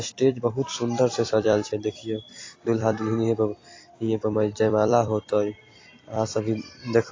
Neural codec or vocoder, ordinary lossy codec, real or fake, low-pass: vocoder, 44.1 kHz, 128 mel bands every 256 samples, BigVGAN v2; AAC, 32 kbps; fake; 7.2 kHz